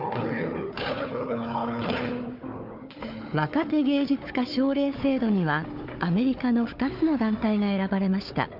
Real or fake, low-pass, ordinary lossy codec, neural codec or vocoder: fake; 5.4 kHz; none; codec, 16 kHz, 4 kbps, FunCodec, trained on Chinese and English, 50 frames a second